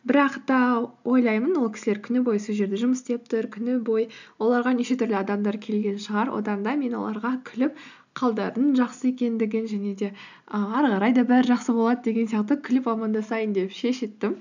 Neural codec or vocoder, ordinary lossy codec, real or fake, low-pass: none; none; real; 7.2 kHz